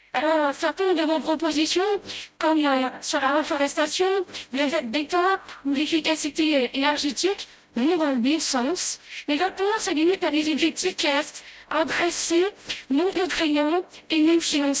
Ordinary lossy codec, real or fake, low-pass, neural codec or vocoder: none; fake; none; codec, 16 kHz, 0.5 kbps, FreqCodec, smaller model